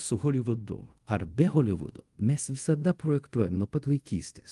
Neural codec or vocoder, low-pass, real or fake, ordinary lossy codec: codec, 24 kHz, 0.5 kbps, DualCodec; 10.8 kHz; fake; Opus, 24 kbps